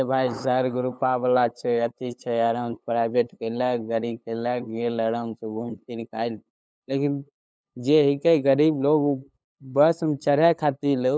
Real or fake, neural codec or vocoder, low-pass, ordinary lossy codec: fake; codec, 16 kHz, 8 kbps, FunCodec, trained on LibriTTS, 25 frames a second; none; none